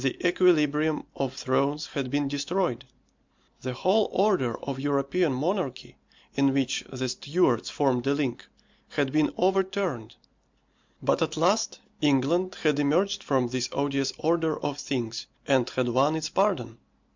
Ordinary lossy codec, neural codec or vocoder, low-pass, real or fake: MP3, 64 kbps; none; 7.2 kHz; real